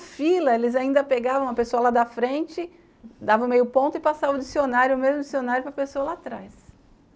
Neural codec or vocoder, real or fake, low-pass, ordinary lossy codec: none; real; none; none